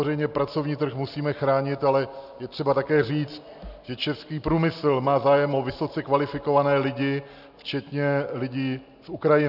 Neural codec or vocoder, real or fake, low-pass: none; real; 5.4 kHz